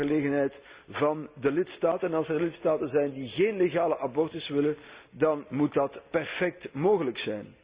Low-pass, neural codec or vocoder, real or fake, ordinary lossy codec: 3.6 kHz; none; real; Opus, 64 kbps